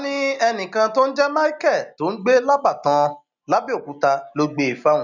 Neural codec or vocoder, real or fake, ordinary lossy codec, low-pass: none; real; none; 7.2 kHz